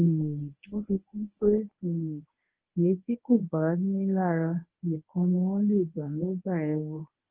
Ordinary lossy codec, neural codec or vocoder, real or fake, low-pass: Opus, 16 kbps; codec, 24 kHz, 0.9 kbps, WavTokenizer, medium speech release version 1; fake; 3.6 kHz